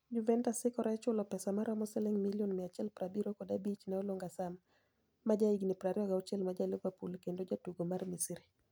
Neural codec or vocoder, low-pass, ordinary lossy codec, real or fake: none; none; none; real